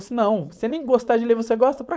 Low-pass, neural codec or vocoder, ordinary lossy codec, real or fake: none; codec, 16 kHz, 4.8 kbps, FACodec; none; fake